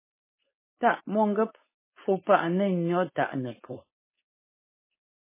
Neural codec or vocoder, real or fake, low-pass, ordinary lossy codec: codec, 16 kHz, 4.8 kbps, FACodec; fake; 3.6 kHz; MP3, 16 kbps